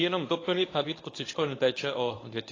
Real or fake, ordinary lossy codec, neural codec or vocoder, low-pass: fake; AAC, 32 kbps; codec, 24 kHz, 0.9 kbps, WavTokenizer, medium speech release version 1; 7.2 kHz